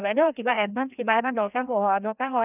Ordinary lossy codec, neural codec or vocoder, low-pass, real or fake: none; codec, 16 kHz, 1 kbps, FreqCodec, larger model; 3.6 kHz; fake